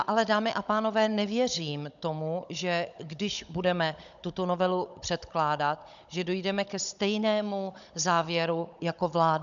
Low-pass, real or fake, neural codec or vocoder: 7.2 kHz; fake; codec, 16 kHz, 16 kbps, FunCodec, trained on Chinese and English, 50 frames a second